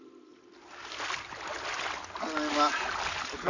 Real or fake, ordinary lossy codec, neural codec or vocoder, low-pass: real; none; none; 7.2 kHz